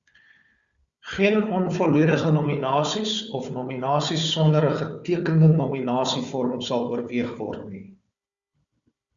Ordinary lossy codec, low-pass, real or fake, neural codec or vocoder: Opus, 64 kbps; 7.2 kHz; fake; codec, 16 kHz, 4 kbps, FunCodec, trained on Chinese and English, 50 frames a second